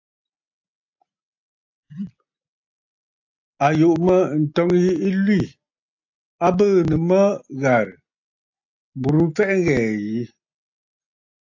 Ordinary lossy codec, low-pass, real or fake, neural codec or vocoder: AAC, 48 kbps; 7.2 kHz; real; none